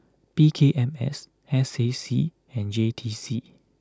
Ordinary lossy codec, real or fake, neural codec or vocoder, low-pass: none; real; none; none